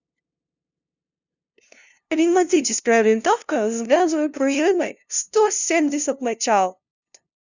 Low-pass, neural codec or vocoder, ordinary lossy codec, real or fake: 7.2 kHz; codec, 16 kHz, 0.5 kbps, FunCodec, trained on LibriTTS, 25 frames a second; none; fake